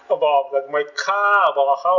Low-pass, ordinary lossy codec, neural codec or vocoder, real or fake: 7.2 kHz; none; none; real